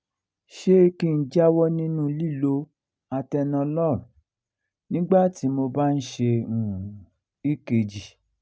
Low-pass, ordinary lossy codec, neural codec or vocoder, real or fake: none; none; none; real